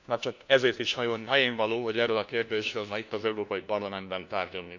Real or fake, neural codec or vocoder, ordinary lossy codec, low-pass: fake; codec, 16 kHz, 1 kbps, FunCodec, trained on LibriTTS, 50 frames a second; none; 7.2 kHz